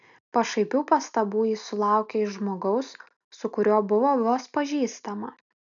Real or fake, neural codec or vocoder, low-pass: real; none; 7.2 kHz